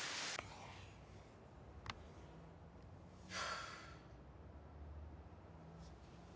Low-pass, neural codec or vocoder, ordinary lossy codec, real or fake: none; none; none; real